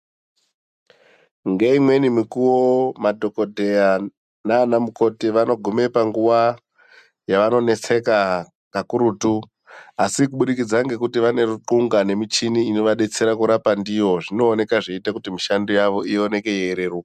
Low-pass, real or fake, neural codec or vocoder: 14.4 kHz; real; none